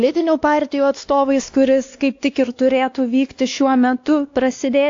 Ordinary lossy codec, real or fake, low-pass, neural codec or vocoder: AAC, 48 kbps; fake; 7.2 kHz; codec, 16 kHz, 2 kbps, X-Codec, WavLM features, trained on Multilingual LibriSpeech